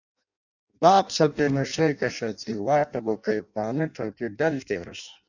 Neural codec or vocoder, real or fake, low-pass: codec, 16 kHz in and 24 kHz out, 0.6 kbps, FireRedTTS-2 codec; fake; 7.2 kHz